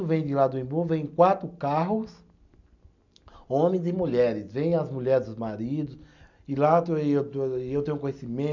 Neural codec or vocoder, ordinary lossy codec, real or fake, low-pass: none; none; real; 7.2 kHz